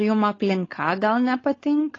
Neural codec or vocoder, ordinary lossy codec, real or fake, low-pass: codec, 16 kHz, 4.8 kbps, FACodec; AAC, 32 kbps; fake; 7.2 kHz